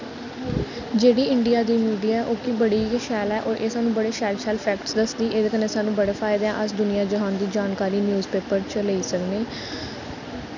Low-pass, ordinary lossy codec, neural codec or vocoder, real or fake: 7.2 kHz; none; none; real